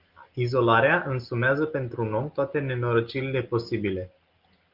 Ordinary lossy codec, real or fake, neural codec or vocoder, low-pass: Opus, 32 kbps; real; none; 5.4 kHz